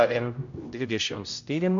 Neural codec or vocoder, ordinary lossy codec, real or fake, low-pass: codec, 16 kHz, 0.5 kbps, X-Codec, HuBERT features, trained on general audio; MP3, 64 kbps; fake; 7.2 kHz